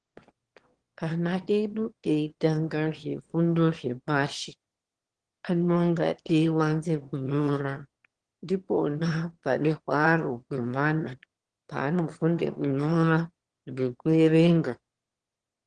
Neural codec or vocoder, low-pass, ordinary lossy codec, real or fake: autoencoder, 22.05 kHz, a latent of 192 numbers a frame, VITS, trained on one speaker; 9.9 kHz; Opus, 16 kbps; fake